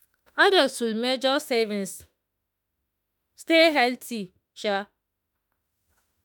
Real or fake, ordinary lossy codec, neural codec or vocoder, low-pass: fake; none; autoencoder, 48 kHz, 32 numbers a frame, DAC-VAE, trained on Japanese speech; none